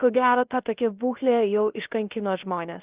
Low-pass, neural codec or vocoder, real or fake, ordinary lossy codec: 3.6 kHz; codec, 16 kHz, 0.3 kbps, FocalCodec; fake; Opus, 32 kbps